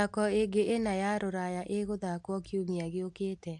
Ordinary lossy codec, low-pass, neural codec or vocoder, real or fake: AAC, 64 kbps; 10.8 kHz; none; real